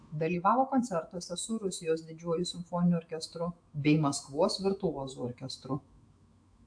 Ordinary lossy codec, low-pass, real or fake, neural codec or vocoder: AAC, 64 kbps; 9.9 kHz; fake; autoencoder, 48 kHz, 128 numbers a frame, DAC-VAE, trained on Japanese speech